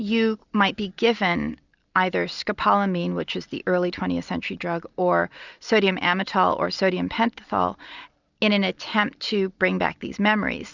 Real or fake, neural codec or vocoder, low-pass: real; none; 7.2 kHz